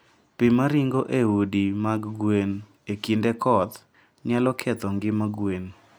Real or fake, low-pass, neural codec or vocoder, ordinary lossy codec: real; none; none; none